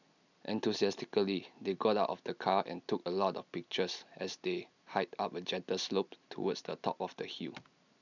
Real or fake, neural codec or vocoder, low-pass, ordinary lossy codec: real; none; 7.2 kHz; none